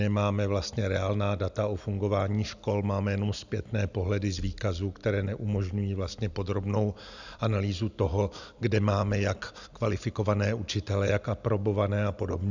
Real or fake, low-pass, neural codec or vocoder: real; 7.2 kHz; none